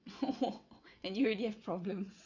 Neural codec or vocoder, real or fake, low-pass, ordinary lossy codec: vocoder, 22.05 kHz, 80 mel bands, WaveNeXt; fake; 7.2 kHz; none